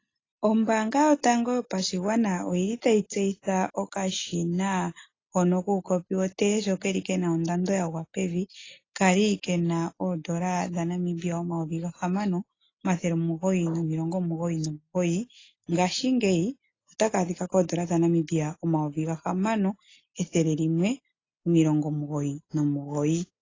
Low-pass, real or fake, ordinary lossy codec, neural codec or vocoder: 7.2 kHz; real; AAC, 32 kbps; none